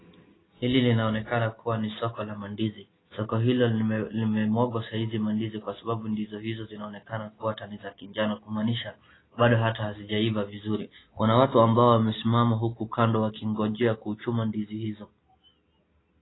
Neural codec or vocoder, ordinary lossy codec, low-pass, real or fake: none; AAC, 16 kbps; 7.2 kHz; real